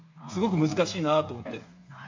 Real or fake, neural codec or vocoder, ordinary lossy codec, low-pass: fake; codec, 16 kHz, 8 kbps, FreqCodec, smaller model; MP3, 48 kbps; 7.2 kHz